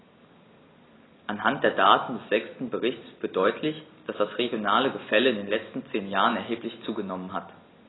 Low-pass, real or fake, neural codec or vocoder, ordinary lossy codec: 7.2 kHz; real; none; AAC, 16 kbps